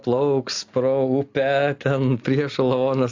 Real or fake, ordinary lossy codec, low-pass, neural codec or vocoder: real; AAC, 48 kbps; 7.2 kHz; none